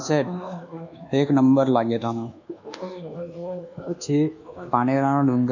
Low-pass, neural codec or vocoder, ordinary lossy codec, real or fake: 7.2 kHz; codec, 24 kHz, 1.2 kbps, DualCodec; none; fake